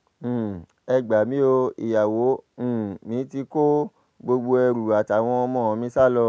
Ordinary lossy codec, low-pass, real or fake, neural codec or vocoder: none; none; real; none